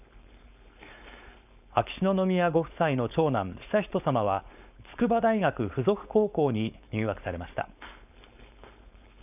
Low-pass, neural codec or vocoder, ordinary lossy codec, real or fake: 3.6 kHz; codec, 16 kHz, 4.8 kbps, FACodec; none; fake